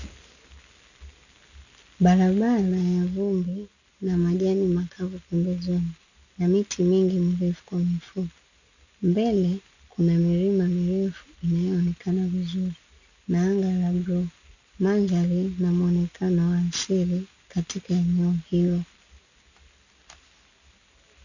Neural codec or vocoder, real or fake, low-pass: none; real; 7.2 kHz